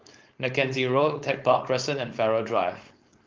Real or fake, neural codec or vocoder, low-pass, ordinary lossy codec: fake; codec, 16 kHz, 4.8 kbps, FACodec; 7.2 kHz; Opus, 32 kbps